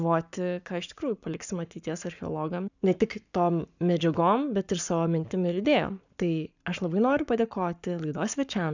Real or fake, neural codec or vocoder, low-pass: fake; codec, 44.1 kHz, 7.8 kbps, Pupu-Codec; 7.2 kHz